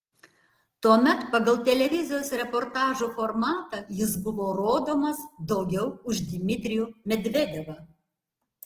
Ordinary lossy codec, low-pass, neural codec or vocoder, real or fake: Opus, 16 kbps; 14.4 kHz; none; real